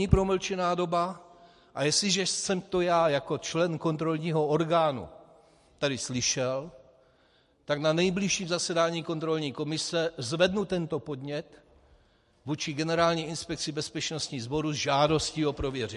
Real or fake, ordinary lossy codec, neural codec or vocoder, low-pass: real; MP3, 48 kbps; none; 14.4 kHz